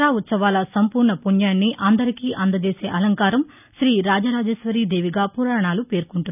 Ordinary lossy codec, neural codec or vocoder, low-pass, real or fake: none; none; 3.6 kHz; real